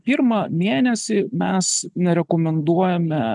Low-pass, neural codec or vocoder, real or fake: 10.8 kHz; none; real